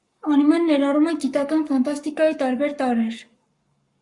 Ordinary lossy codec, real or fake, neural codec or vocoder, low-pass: Opus, 64 kbps; fake; codec, 44.1 kHz, 7.8 kbps, Pupu-Codec; 10.8 kHz